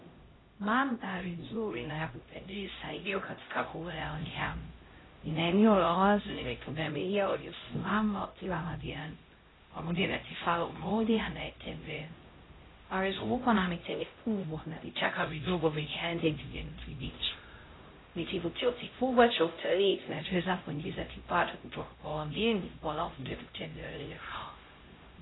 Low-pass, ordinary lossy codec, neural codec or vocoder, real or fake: 7.2 kHz; AAC, 16 kbps; codec, 16 kHz, 0.5 kbps, X-Codec, HuBERT features, trained on LibriSpeech; fake